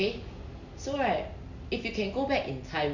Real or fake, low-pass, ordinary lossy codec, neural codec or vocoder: real; 7.2 kHz; none; none